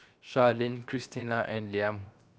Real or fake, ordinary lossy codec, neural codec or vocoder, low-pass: fake; none; codec, 16 kHz, 0.7 kbps, FocalCodec; none